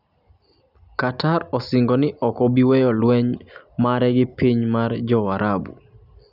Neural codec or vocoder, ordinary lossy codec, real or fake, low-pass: none; none; real; 5.4 kHz